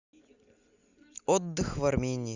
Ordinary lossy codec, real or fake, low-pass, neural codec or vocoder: Opus, 64 kbps; real; 7.2 kHz; none